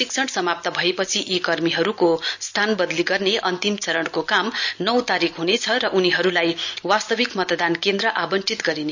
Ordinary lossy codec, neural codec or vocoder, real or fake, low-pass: none; none; real; 7.2 kHz